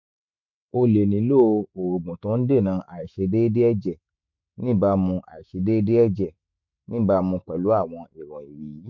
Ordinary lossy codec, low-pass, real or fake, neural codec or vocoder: MP3, 64 kbps; 7.2 kHz; fake; autoencoder, 48 kHz, 128 numbers a frame, DAC-VAE, trained on Japanese speech